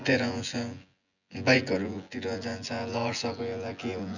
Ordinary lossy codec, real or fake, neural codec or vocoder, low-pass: none; fake; vocoder, 24 kHz, 100 mel bands, Vocos; 7.2 kHz